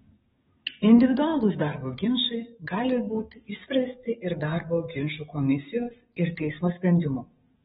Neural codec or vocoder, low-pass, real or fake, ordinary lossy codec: codec, 44.1 kHz, 7.8 kbps, DAC; 19.8 kHz; fake; AAC, 16 kbps